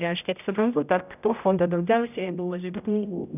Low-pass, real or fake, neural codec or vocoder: 3.6 kHz; fake; codec, 16 kHz, 0.5 kbps, X-Codec, HuBERT features, trained on general audio